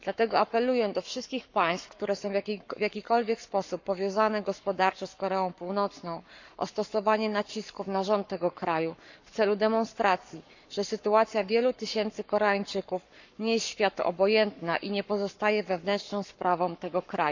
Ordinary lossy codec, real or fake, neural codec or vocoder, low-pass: none; fake; codec, 44.1 kHz, 7.8 kbps, Pupu-Codec; 7.2 kHz